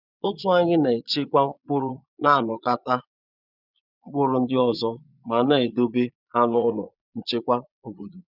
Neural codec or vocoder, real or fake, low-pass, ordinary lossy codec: vocoder, 22.05 kHz, 80 mel bands, Vocos; fake; 5.4 kHz; none